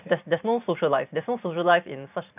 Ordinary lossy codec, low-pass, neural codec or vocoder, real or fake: none; 3.6 kHz; vocoder, 44.1 kHz, 128 mel bands every 512 samples, BigVGAN v2; fake